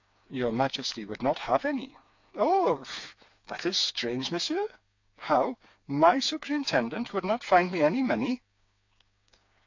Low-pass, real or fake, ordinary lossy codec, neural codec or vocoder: 7.2 kHz; fake; AAC, 48 kbps; codec, 16 kHz, 4 kbps, FreqCodec, smaller model